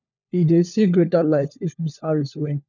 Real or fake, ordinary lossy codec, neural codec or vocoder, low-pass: fake; none; codec, 16 kHz, 4 kbps, FunCodec, trained on LibriTTS, 50 frames a second; 7.2 kHz